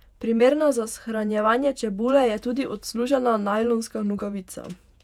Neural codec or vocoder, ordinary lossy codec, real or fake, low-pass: vocoder, 48 kHz, 128 mel bands, Vocos; none; fake; 19.8 kHz